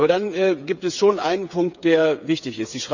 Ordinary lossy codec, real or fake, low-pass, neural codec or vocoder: none; fake; 7.2 kHz; codec, 16 kHz, 8 kbps, FreqCodec, smaller model